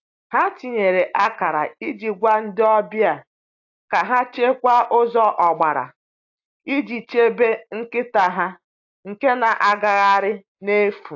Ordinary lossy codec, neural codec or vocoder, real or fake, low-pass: AAC, 48 kbps; none; real; 7.2 kHz